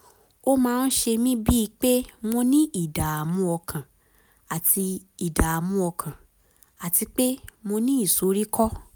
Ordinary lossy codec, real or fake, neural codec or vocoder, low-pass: none; real; none; none